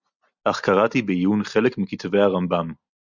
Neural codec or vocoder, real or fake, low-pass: none; real; 7.2 kHz